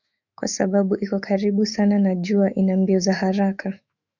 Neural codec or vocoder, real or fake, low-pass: autoencoder, 48 kHz, 128 numbers a frame, DAC-VAE, trained on Japanese speech; fake; 7.2 kHz